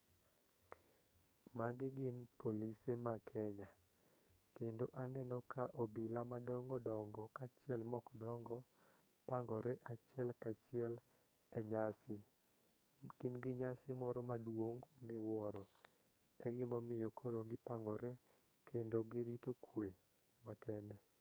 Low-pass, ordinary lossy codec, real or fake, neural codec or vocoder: none; none; fake; codec, 44.1 kHz, 2.6 kbps, SNAC